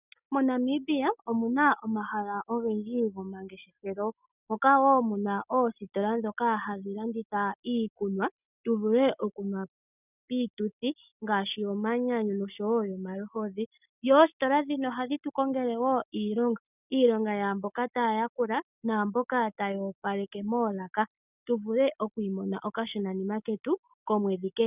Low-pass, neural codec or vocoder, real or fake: 3.6 kHz; none; real